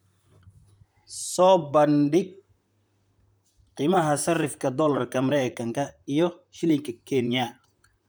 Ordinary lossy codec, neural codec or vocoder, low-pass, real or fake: none; vocoder, 44.1 kHz, 128 mel bands, Pupu-Vocoder; none; fake